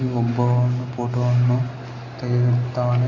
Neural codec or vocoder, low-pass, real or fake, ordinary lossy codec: none; 7.2 kHz; real; none